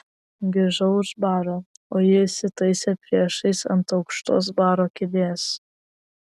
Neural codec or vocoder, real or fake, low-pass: none; real; 14.4 kHz